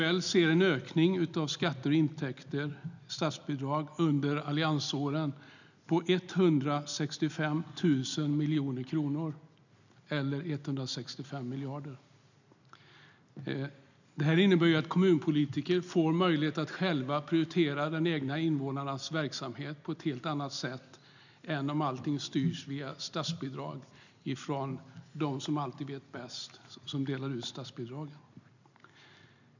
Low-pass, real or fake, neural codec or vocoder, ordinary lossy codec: 7.2 kHz; real; none; none